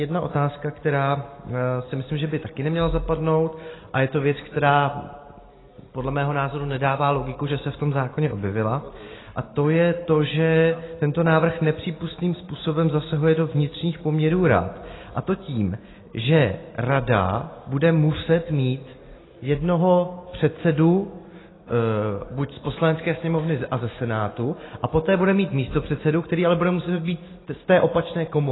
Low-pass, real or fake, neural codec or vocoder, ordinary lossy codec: 7.2 kHz; real; none; AAC, 16 kbps